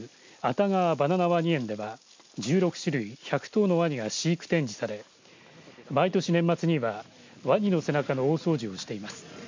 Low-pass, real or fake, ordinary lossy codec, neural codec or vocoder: 7.2 kHz; real; MP3, 64 kbps; none